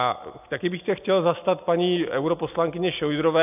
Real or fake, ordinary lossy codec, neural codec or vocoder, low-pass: real; AAC, 32 kbps; none; 3.6 kHz